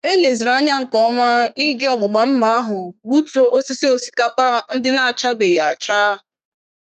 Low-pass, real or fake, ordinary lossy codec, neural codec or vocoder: 14.4 kHz; fake; none; codec, 32 kHz, 1.9 kbps, SNAC